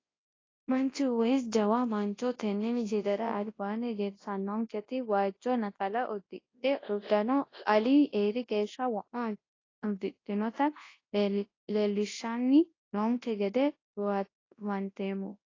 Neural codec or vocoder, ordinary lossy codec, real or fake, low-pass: codec, 24 kHz, 0.9 kbps, WavTokenizer, large speech release; AAC, 32 kbps; fake; 7.2 kHz